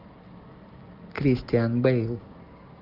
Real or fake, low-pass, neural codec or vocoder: real; 5.4 kHz; none